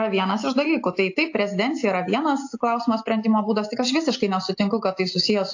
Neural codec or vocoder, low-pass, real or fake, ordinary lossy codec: none; 7.2 kHz; real; AAC, 48 kbps